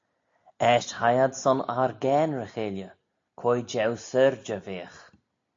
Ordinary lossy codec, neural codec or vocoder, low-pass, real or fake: AAC, 48 kbps; none; 7.2 kHz; real